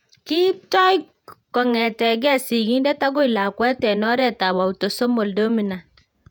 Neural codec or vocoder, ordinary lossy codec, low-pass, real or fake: vocoder, 48 kHz, 128 mel bands, Vocos; none; 19.8 kHz; fake